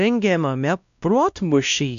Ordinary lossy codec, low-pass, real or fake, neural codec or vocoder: AAC, 96 kbps; 7.2 kHz; fake; codec, 16 kHz, 1 kbps, X-Codec, WavLM features, trained on Multilingual LibriSpeech